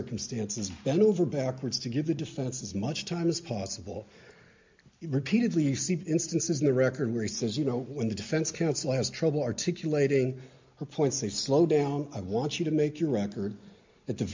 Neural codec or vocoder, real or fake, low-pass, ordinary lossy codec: none; real; 7.2 kHz; MP3, 64 kbps